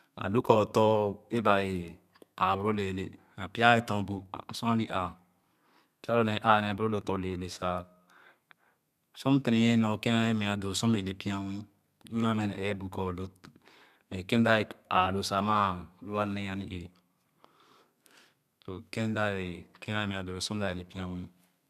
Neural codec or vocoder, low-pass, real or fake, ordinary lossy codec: codec, 32 kHz, 1.9 kbps, SNAC; 14.4 kHz; fake; none